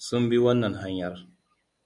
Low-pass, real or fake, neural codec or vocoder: 10.8 kHz; real; none